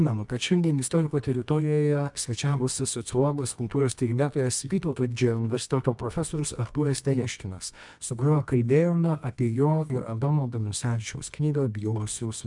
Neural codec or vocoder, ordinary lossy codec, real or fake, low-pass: codec, 24 kHz, 0.9 kbps, WavTokenizer, medium music audio release; MP3, 96 kbps; fake; 10.8 kHz